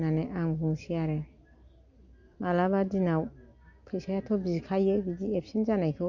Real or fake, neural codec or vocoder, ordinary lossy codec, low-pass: real; none; Opus, 64 kbps; 7.2 kHz